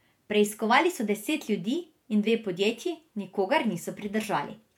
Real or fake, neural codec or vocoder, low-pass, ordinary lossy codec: fake; vocoder, 48 kHz, 128 mel bands, Vocos; 19.8 kHz; MP3, 96 kbps